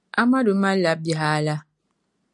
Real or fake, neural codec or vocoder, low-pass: real; none; 10.8 kHz